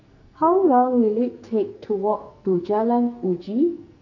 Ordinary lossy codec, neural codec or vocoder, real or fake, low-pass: none; codec, 44.1 kHz, 2.6 kbps, SNAC; fake; 7.2 kHz